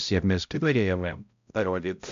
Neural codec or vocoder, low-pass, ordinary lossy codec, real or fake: codec, 16 kHz, 0.5 kbps, X-Codec, HuBERT features, trained on balanced general audio; 7.2 kHz; MP3, 64 kbps; fake